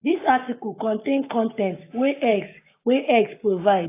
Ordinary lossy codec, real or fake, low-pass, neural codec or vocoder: AAC, 24 kbps; fake; 3.6 kHz; codec, 44.1 kHz, 7.8 kbps, Pupu-Codec